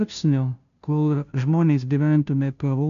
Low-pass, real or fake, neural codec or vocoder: 7.2 kHz; fake; codec, 16 kHz, 0.5 kbps, FunCodec, trained on Chinese and English, 25 frames a second